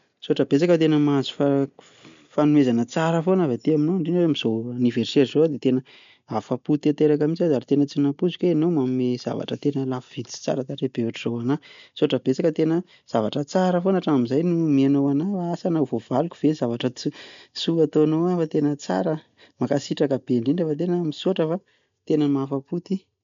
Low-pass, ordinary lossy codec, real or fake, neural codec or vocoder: 7.2 kHz; MP3, 64 kbps; real; none